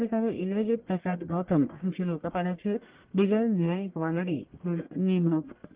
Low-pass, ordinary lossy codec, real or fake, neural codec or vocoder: 3.6 kHz; Opus, 16 kbps; fake; codec, 44.1 kHz, 1.7 kbps, Pupu-Codec